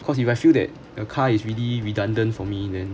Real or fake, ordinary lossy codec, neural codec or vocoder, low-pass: real; none; none; none